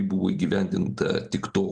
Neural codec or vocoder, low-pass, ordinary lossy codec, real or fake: none; 9.9 kHz; Opus, 32 kbps; real